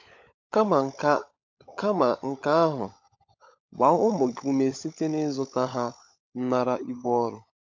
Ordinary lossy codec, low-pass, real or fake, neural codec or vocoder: MP3, 64 kbps; 7.2 kHz; fake; codec, 16 kHz, 16 kbps, FunCodec, trained on LibriTTS, 50 frames a second